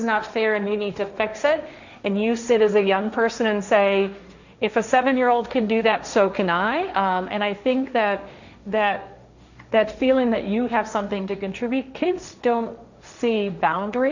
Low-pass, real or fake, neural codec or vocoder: 7.2 kHz; fake; codec, 16 kHz, 1.1 kbps, Voila-Tokenizer